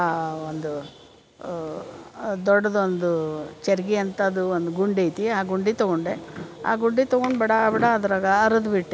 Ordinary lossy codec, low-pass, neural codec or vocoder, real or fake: none; none; none; real